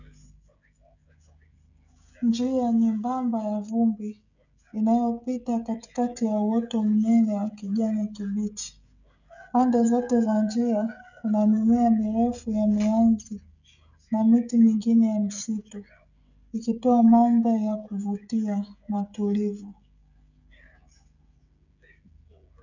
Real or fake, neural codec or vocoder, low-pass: fake; codec, 16 kHz, 16 kbps, FreqCodec, smaller model; 7.2 kHz